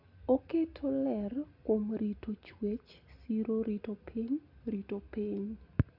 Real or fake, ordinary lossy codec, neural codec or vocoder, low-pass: real; Opus, 64 kbps; none; 5.4 kHz